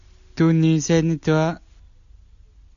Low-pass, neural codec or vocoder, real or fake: 7.2 kHz; none; real